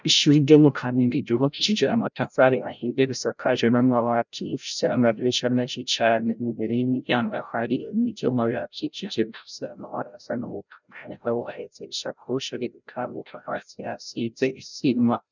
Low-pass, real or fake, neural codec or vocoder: 7.2 kHz; fake; codec, 16 kHz, 0.5 kbps, FreqCodec, larger model